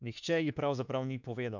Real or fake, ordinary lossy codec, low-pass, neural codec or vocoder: fake; none; 7.2 kHz; autoencoder, 48 kHz, 32 numbers a frame, DAC-VAE, trained on Japanese speech